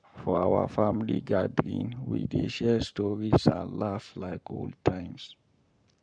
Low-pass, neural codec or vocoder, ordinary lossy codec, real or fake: 9.9 kHz; codec, 44.1 kHz, 7.8 kbps, Pupu-Codec; MP3, 96 kbps; fake